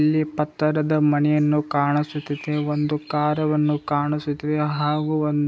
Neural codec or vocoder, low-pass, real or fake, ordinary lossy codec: none; none; real; none